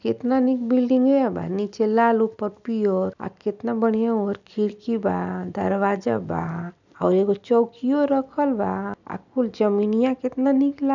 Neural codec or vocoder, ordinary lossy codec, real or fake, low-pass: none; none; real; 7.2 kHz